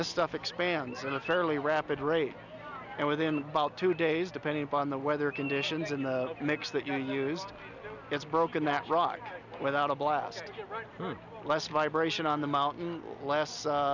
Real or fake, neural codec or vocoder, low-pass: real; none; 7.2 kHz